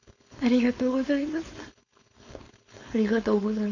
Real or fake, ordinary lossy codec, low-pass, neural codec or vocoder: fake; MP3, 64 kbps; 7.2 kHz; codec, 16 kHz, 4.8 kbps, FACodec